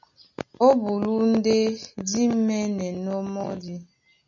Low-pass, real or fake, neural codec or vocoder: 7.2 kHz; real; none